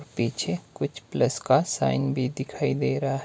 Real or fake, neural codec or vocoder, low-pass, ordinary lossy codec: real; none; none; none